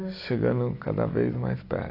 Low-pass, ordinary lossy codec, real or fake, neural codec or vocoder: 5.4 kHz; AAC, 32 kbps; real; none